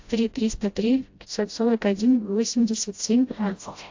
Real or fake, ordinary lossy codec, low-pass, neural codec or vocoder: fake; AAC, 48 kbps; 7.2 kHz; codec, 16 kHz, 0.5 kbps, FreqCodec, smaller model